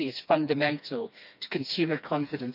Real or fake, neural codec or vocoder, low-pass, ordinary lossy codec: fake; codec, 16 kHz, 2 kbps, FreqCodec, smaller model; 5.4 kHz; none